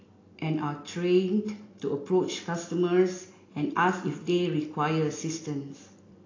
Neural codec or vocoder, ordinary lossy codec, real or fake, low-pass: none; AAC, 32 kbps; real; 7.2 kHz